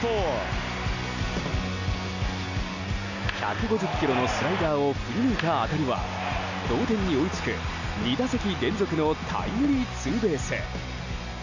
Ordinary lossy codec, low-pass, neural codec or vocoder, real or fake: none; 7.2 kHz; none; real